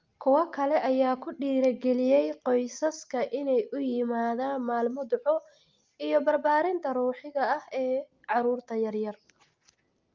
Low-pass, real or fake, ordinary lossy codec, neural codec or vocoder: 7.2 kHz; real; Opus, 24 kbps; none